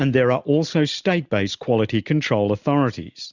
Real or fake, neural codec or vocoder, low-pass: real; none; 7.2 kHz